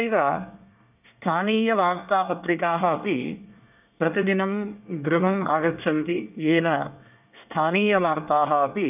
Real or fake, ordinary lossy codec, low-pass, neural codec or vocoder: fake; none; 3.6 kHz; codec, 24 kHz, 1 kbps, SNAC